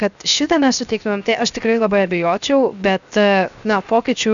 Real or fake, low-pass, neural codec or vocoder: fake; 7.2 kHz; codec, 16 kHz, 0.7 kbps, FocalCodec